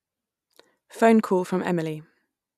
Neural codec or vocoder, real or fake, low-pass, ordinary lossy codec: none; real; 14.4 kHz; none